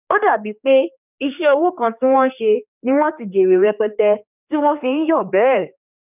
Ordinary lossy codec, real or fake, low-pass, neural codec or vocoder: none; fake; 3.6 kHz; codec, 16 kHz, 2 kbps, X-Codec, HuBERT features, trained on general audio